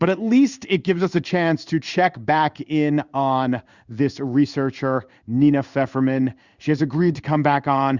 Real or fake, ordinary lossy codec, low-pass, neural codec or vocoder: fake; Opus, 64 kbps; 7.2 kHz; codec, 16 kHz in and 24 kHz out, 1 kbps, XY-Tokenizer